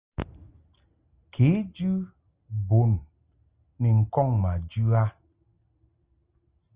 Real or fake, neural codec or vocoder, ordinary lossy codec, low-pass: real; none; Opus, 24 kbps; 3.6 kHz